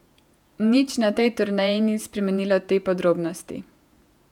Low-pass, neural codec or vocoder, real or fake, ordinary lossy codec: 19.8 kHz; vocoder, 48 kHz, 128 mel bands, Vocos; fake; none